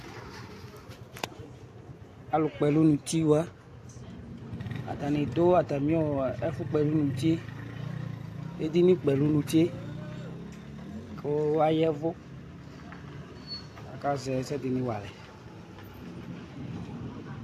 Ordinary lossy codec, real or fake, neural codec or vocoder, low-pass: AAC, 64 kbps; real; none; 14.4 kHz